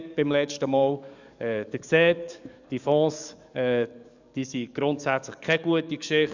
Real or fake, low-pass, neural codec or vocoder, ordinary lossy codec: fake; 7.2 kHz; codec, 44.1 kHz, 7.8 kbps, DAC; none